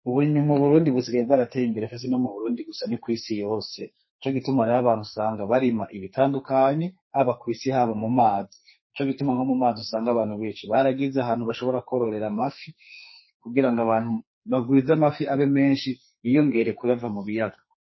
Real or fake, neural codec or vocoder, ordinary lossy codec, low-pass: fake; codec, 44.1 kHz, 2.6 kbps, SNAC; MP3, 24 kbps; 7.2 kHz